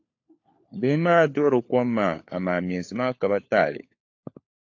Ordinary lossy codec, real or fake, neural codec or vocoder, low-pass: AAC, 48 kbps; fake; codec, 16 kHz, 4 kbps, FunCodec, trained on LibriTTS, 50 frames a second; 7.2 kHz